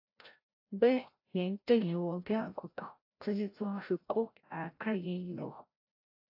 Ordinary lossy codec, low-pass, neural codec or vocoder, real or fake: AAC, 32 kbps; 5.4 kHz; codec, 16 kHz, 0.5 kbps, FreqCodec, larger model; fake